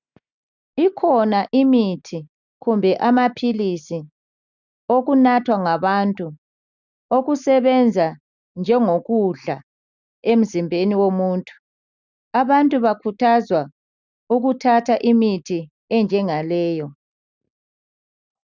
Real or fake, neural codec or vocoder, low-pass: real; none; 7.2 kHz